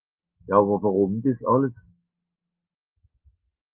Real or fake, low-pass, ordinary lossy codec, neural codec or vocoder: fake; 3.6 kHz; Opus, 32 kbps; autoencoder, 48 kHz, 128 numbers a frame, DAC-VAE, trained on Japanese speech